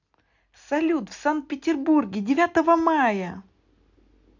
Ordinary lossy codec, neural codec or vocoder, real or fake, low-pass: none; none; real; 7.2 kHz